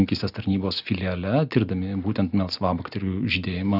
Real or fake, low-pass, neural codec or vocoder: real; 5.4 kHz; none